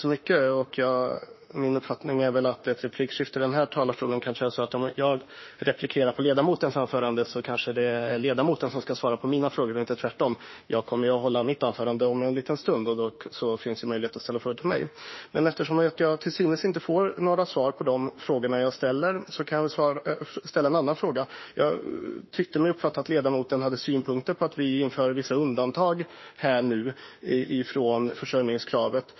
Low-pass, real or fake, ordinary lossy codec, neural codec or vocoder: 7.2 kHz; fake; MP3, 24 kbps; autoencoder, 48 kHz, 32 numbers a frame, DAC-VAE, trained on Japanese speech